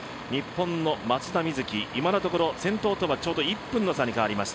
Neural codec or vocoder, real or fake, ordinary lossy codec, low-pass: none; real; none; none